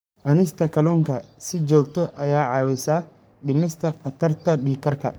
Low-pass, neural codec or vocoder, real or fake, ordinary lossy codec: none; codec, 44.1 kHz, 3.4 kbps, Pupu-Codec; fake; none